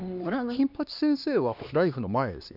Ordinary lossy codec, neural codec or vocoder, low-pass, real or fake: none; codec, 16 kHz, 2 kbps, X-Codec, HuBERT features, trained on LibriSpeech; 5.4 kHz; fake